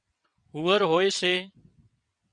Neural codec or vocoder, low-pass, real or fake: vocoder, 22.05 kHz, 80 mel bands, WaveNeXt; 9.9 kHz; fake